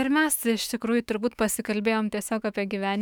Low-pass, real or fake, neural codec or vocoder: 19.8 kHz; fake; autoencoder, 48 kHz, 128 numbers a frame, DAC-VAE, trained on Japanese speech